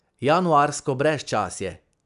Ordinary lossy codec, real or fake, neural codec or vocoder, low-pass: none; real; none; 10.8 kHz